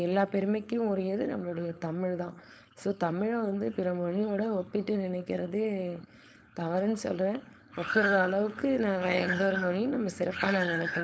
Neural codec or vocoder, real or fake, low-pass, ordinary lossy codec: codec, 16 kHz, 4.8 kbps, FACodec; fake; none; none